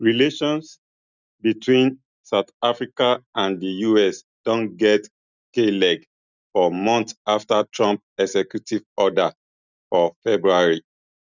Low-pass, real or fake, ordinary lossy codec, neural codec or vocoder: 7.2 kHz; real; none; none